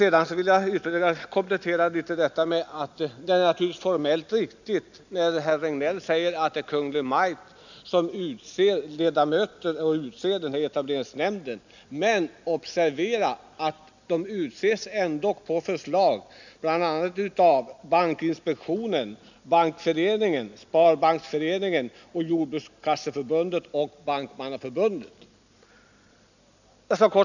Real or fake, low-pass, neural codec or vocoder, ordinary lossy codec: real; 7.2 kHz; none; none